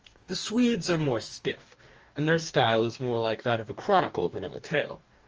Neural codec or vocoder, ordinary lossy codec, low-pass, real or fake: codec, 44.1 kHz, 2.6 kbps, DAC; Opus, 24 kbps; 7.2 kHz; fake